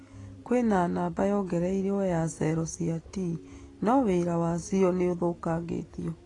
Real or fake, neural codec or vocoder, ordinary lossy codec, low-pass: real; none; AAC, 32 kbps; 10.8 kHz